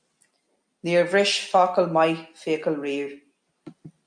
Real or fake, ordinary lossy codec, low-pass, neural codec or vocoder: real; MP3, 48 kbps; 9.9 kHz; none